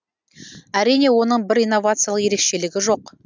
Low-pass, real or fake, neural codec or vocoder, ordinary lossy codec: none; real; none; none